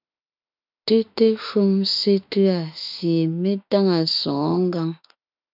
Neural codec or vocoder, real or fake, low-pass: autoencoder, 48 kHz, 32 numbers a frame, DAC-VAE, trained on Japanese speech; fake; 5.4 kHz